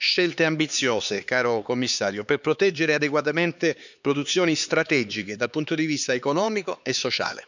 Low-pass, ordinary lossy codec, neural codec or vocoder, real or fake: 7.2 kHz; none; codec, 16 kHz, 4 kbps, X-Codec, HuBERT features, trained on LibriSpeech; fake